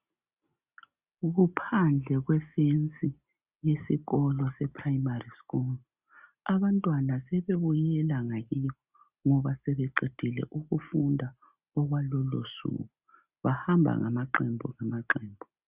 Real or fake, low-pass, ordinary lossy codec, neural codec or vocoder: real; 3.6 kHz; Opus, 64 kbps; none